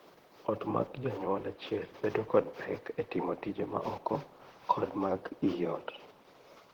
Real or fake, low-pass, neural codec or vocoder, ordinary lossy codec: fake; 19.8 kHz; vocoder, 44.1 kHz, 128 mel bands, Pupu-Vocoder; Opus, 16 kbps